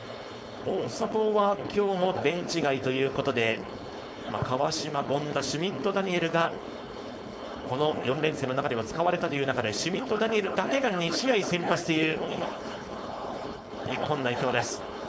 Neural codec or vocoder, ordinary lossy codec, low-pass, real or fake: codec, 16 kHz, 4.8 kbps, FACodec; none; none; fake